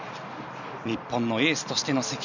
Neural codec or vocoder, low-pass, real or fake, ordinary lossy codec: none; 7.2 kHz; real; none